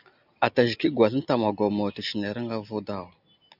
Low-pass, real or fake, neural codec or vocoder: 5.4 kHz; real; none